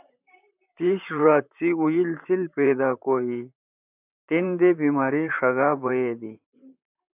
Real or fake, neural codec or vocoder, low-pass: fake; vocoder, 44.1 kHz, 128 mel bands, Pupu-Vocoder; 3.6 kHz